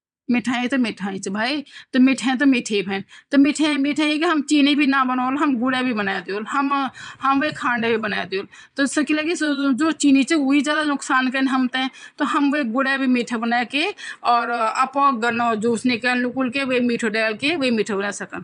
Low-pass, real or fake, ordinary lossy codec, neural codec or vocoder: 9.9 kHz; fake; none; vocoder, 22.05 kHz, 80 mel bands, Vocos